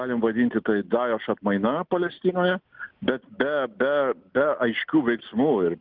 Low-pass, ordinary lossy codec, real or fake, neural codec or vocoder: 5.4 kHz; Opus, 24 kbps; real; none